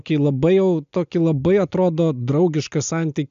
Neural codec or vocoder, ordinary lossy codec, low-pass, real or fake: none; MP3, 64 kbps; 7.2 kHz; real